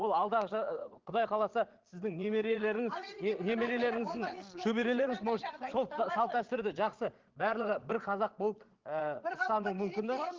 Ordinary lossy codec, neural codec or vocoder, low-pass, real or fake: Opus, 16 kbps; vocoder, 22.05 kHz, 80 mel bands, Vocos; 7.2 kHz; fake